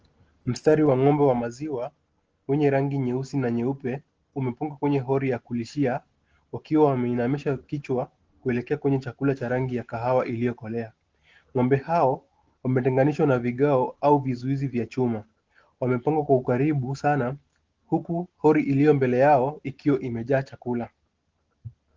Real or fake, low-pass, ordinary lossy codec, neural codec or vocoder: real; 7.2 kHz; Opus, 16 kbps; none